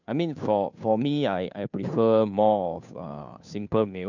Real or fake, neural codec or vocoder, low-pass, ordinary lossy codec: fake; codec, 16 kHz, 2 kbps, FunCodec, trained on Chinese and English, 25 frames a second; 7.2 kHz; none